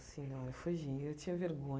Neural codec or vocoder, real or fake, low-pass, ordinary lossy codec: none; real; none; none